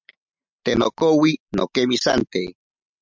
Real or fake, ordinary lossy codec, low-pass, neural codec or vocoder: real; MP3, 64 kbps; 7.2 kHz; none